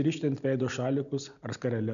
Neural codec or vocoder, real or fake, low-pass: none; real; 7.2 kHz